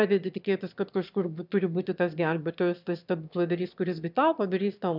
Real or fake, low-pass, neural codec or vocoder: fake; 5.4 kHz; autoencoder, 22.05 kHz, a latent of 192 numbers a frame, VITS, trained on one speaker